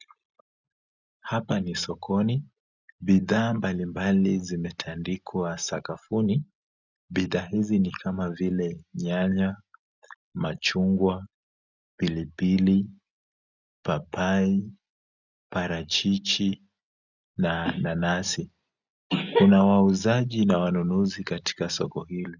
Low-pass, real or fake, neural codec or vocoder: 7.2 kHz; real; none